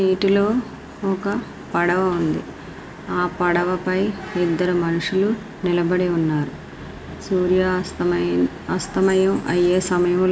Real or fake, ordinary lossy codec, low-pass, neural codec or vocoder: real; none; none; none